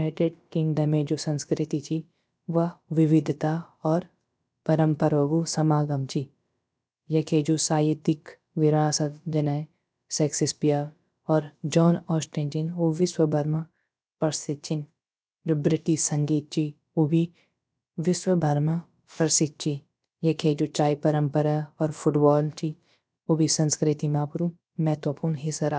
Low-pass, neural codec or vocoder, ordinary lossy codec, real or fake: none; codec, 16 kHz, about 1 kbps, DyCAST, with the encoder's durations; none; fake